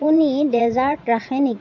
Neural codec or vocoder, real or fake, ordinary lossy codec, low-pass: vocoder, 22.05 kHz, 80 mel bands, WaveNeXt; fake; none; 7.2 kHz